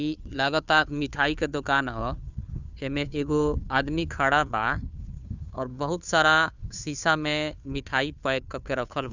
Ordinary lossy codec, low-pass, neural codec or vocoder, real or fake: none; 7.2 kHz; codec, 16 kHz, 2 kbps, FunCodec, trained on Chinese and English, 25 frames a second; fake